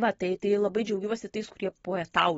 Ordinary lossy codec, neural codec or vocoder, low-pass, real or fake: AAC, 24 kbps; none; 9.9 kHz; real